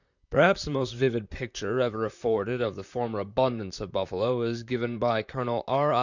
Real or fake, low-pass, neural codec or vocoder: fake; 7.2 kHz; vocoder, 44.1 kHz, 128 mel bands, Pupu-Vocoder